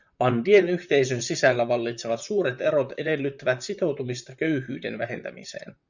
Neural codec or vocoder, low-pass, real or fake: vocoder, 44.1 kHz, 128 mel bands, Pupu-Vocoder; 7.2 kHz; fake